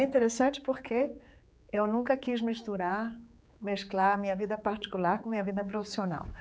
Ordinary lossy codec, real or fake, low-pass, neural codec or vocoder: none; fake; none; codec, 16 kHz, 4 kbps, X-Codec, HuBERT features, trained on general audio